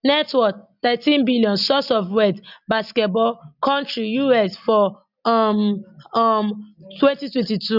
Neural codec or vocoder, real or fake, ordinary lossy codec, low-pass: none; real; none; 5.4 kHz